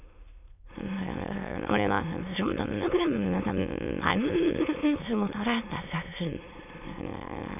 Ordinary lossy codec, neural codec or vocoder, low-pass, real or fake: none; autoencoder, 22.05 kHz, a latent of 192 numbers a frame, VITS, trained on many speakers; 3.6 kHz; fake